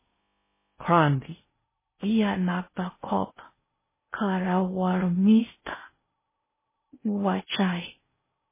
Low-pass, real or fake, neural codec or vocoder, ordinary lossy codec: 3.6 kHz; fake; codec, 16 kHz in and 24 kHz out, 0.6 kbps, FocalCodec, streaming, 4096 codes; MP3, 16 kbps